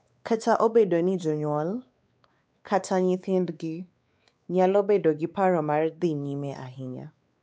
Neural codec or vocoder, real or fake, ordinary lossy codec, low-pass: codec, 16 kHz, 4 kbps, X-Codec, WavLM features, trained on Multilingual LibriSpeech; fake; none; none